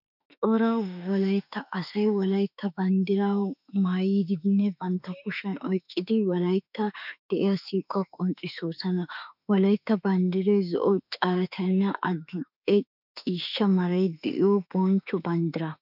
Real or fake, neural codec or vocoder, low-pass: fake; autoencoder, 48 kHz, 32 numbers a frame, DAC-VAE, trained on Japanese speech; 5.4 kHz